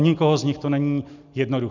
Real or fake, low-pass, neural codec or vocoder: real; 7.2 kHz; none